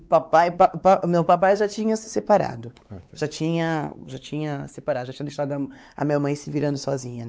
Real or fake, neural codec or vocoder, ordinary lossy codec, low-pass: fake; codec, 16 kHz, 4 kbps, X-Codec, WavLM features, trained on Multilingual LibriSpeech; none; none